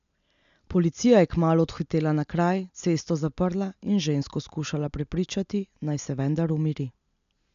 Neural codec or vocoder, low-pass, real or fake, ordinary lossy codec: none; 7.2 kHz; real; none